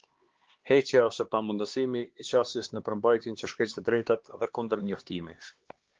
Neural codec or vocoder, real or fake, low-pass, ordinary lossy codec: codec, 16 kHz, 2 kbps, X-Codec, HuBERT features, trained on LibriSpeech; fake; 7.2 kHz; Opus, 16 kbps